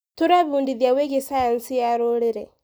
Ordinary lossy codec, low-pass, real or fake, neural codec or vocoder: none; none; real; none